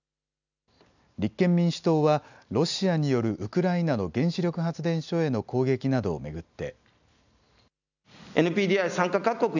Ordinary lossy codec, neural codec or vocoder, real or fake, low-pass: none; none; real; 7.2 kHz